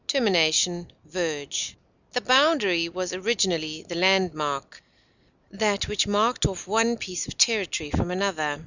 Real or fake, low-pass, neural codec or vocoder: real; 7.2 kHz; none